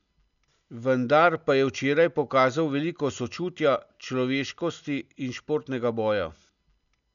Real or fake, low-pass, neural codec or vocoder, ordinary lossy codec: real; 7.2 kHz; none; none